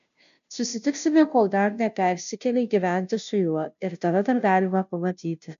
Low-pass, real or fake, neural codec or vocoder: 7.2 kHz; fake; codec, 16 kHz, 0.5 kbps, FunCodec, trained on Chinese and English, 25 frames a second